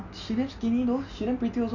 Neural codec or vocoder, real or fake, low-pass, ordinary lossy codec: none; real; 7.2 kHz; none